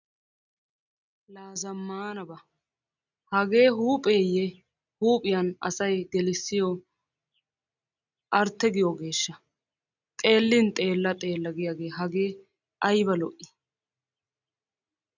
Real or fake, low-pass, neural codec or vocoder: real; 7.2 kHz; none